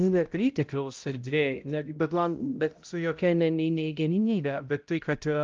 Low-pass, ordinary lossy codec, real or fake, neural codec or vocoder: 7.2 kHz; Opus, 24 kbps; fake; codec, 16 kHz, 0.5 kbps, X-Codec, HuBERT features, trained on balanced general audio